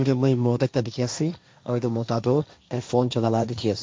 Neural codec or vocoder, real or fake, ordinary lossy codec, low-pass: codec, 16 kHz, 1.1 kbps, Voila-Tokenizer; fake; none; none